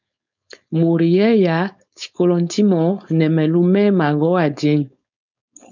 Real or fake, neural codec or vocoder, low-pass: fake; codec, 16 kHz, 4.8 kbps, FACodec; 7.2 kHz